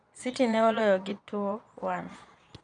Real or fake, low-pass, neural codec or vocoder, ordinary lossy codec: fake; 9.9 kHz; vocoder, 22.05 kHz, 80 mel bands, Vocos; none